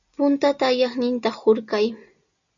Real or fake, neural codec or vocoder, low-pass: real; none; 7.2 kHz